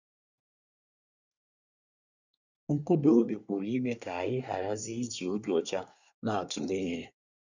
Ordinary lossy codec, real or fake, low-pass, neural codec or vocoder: none; fake; 7.2 kHz; codec, 24 kHz, 1 kbps, SNAC